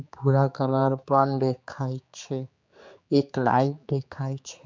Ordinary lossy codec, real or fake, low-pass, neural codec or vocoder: MP3, 64 kbps; fake; 7.2 kHz; codec, 16 kHz, 4 kbps, X-Codec, HuBERT features, trained on general audio